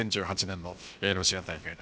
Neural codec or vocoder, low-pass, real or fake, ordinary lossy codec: codec, 16 kHz, about 1 kbps, DyCAST, with the encoder's durations; none; fake; none